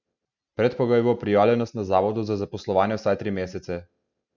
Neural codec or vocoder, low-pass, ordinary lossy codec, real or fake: none; 7.2 kHz; none; real